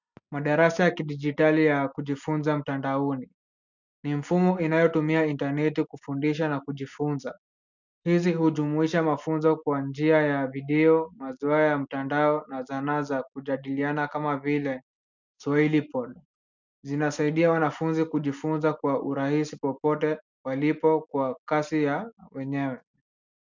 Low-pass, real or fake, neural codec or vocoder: 7.2 kHz; real; none